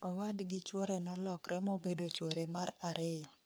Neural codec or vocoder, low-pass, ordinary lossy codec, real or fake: codec, 44.1 kHz, 3.4 kbps, Pupu-Codec; none; none; fake